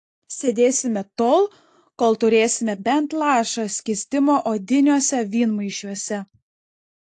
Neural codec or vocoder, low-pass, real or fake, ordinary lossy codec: none; 10.8 kHz; real; AAC, 48 kbps